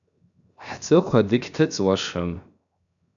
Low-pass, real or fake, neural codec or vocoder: 7.2 kHz; fake; codec, 16 kHz, 0.7 kbps, FocalCodec